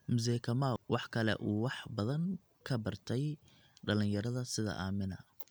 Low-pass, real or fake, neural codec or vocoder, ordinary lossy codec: none; real; none; none